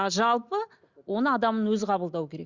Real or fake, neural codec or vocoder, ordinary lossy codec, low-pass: real; none; Opus, 64 kbps; 7.2 kHz